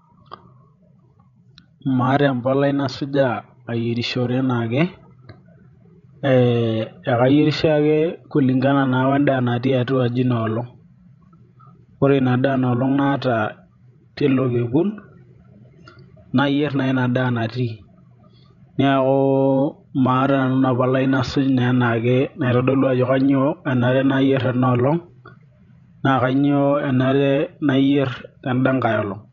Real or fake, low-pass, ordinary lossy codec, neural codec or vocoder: fake; 7.2 kHz; none; codec, 16 kHz, 16 kbps, FreqCodec, larger model